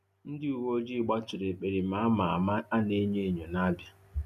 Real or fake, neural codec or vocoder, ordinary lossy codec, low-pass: real; none; none; 14.4 kHz